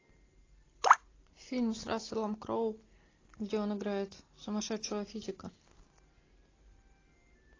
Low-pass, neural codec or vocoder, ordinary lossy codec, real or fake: 7.2 kHz; none; AAC, 32 kbps; real